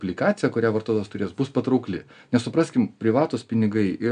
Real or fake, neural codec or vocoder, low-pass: real; none; 9.9 kHz